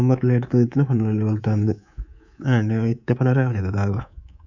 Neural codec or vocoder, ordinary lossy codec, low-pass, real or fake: codec, 24 kHz, 3.1 kbps, DualCodec; none; 7.2 kHz; fake